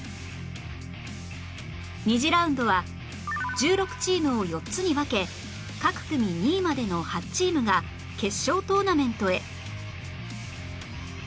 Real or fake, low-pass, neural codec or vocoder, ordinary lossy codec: real; none; none; none